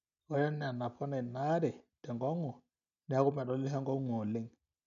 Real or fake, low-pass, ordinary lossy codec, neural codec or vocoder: real; 7.2 kHz; MP3, 96 kbps; none